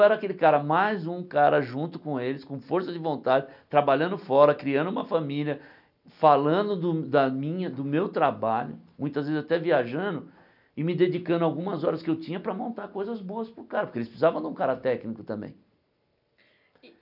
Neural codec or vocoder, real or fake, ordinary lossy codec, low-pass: none; real; none; 5.4 kHz